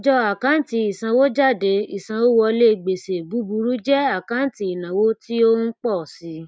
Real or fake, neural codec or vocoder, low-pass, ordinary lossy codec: real; none; none; none